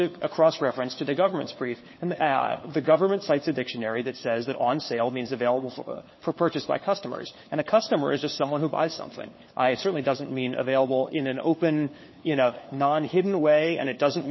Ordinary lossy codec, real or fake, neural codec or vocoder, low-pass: MP3, 24 kbps; fake; codec, 16 kHz, 4 kbps, FunCodec, trained on LibriTTS, 50 frames a second; 7.2 kHz